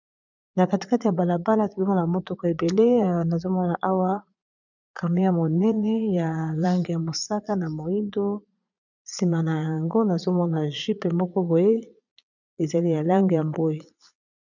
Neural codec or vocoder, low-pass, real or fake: vocoder, 22.05 kHz, 80 mel bands, Vocos; 7.2 kHz; fake